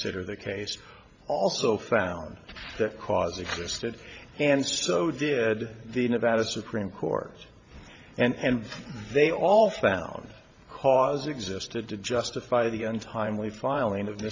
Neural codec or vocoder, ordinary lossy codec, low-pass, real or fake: vocoder, 44.1 kHz, 128 mel bands every 512 samples, BigVGAN v2; MP3, 64 kbps; 7.2 kHz; fake